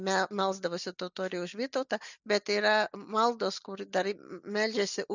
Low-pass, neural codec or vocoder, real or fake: 7.2 kHz; none; real